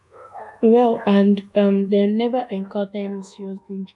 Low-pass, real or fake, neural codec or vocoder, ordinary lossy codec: 10.8 kHz; fake; codec, 24 kHz, 1.2 kbps, DualCodec; none